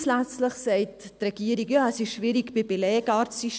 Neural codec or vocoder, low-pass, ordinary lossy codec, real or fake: none; none; none; real